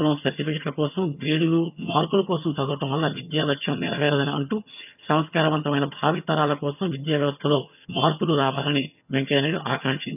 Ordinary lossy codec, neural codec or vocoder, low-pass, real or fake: none; vocoder, 22.05 kHz, 80 mel bands, HiFi-GAN; 3.6 kHz; fake